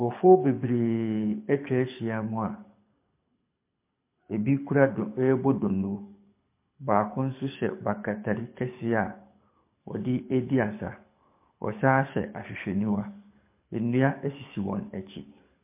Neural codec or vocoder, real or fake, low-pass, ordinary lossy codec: codec, 24 kHz, 6 kbps, HILCodec; fake; 3.6 kHz; MP3, 24 kbps